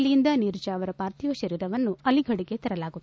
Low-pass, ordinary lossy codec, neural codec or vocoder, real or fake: none; none; none; real